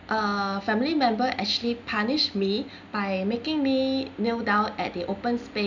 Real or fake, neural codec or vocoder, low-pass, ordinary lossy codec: real; none; 7.2 kHz; none